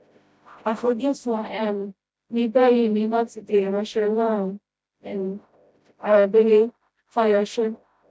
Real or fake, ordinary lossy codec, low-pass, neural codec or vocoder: fake; none; none; codec, 16 kHz, 0.5 kbps, FreqCodec, smaller model